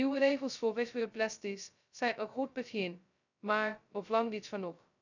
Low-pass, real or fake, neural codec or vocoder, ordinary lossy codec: 7.2 kHz; fake; codec, 16 kHz, 0.2 kbps, FocalCodec; none